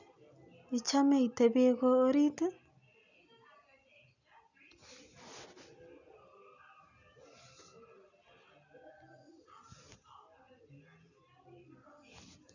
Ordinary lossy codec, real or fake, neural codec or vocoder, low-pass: none; real; none; 7.2 kHz